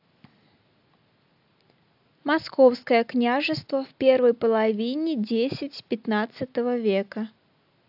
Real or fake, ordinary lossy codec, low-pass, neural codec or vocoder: real; AAC, 48 kbps; 5.4 kHz; none